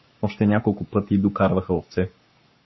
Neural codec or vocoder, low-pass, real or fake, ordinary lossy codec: codec, 44.1 kHz, 7.8 kbps, Pupu-Codec; 7.2 kHz; fake; MP3, 24 kbps